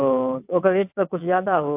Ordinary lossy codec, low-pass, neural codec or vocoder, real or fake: AAC, 32 kbps; 3.6 kHz; vocoder, 22.05 kHz, 80 mel bands, WaveNeXt; fake